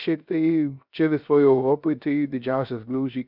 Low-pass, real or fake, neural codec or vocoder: 5.4 kHz; fake; codec, 16 kHz, 0.3 kbps, FocalCodec